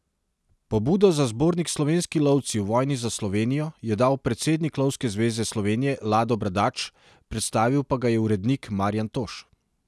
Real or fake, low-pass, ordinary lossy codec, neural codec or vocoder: real; none; none; none